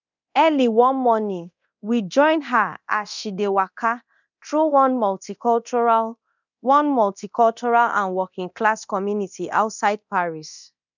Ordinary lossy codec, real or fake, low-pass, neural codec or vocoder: none; fake; 7.2 kHz; codec, 24 kHz, 0.9 kbps, DualCodec